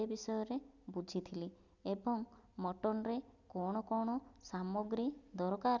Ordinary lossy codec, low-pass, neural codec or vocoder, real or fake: none; 7.2 kHz; none; real